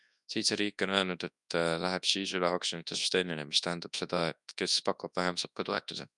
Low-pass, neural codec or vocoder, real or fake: 10.8 kHz; codec, 24 kHz, 0.9 kbps, WavTokenizer, large speech release; fake